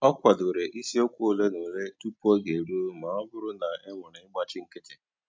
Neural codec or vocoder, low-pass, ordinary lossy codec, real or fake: none; none; none; real